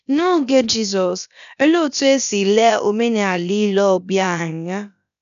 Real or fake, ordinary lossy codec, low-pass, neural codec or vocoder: fake; none; 7.2 kHz; codec, 16 kHz, about 1 kbps, DyCAST, with the encoder's durations